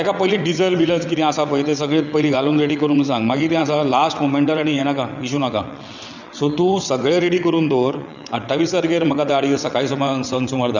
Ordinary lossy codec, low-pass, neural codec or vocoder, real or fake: none; 7.2 kHz; vocoder, 22.05 kHz, 80 mel bands, WaveNeXt; fake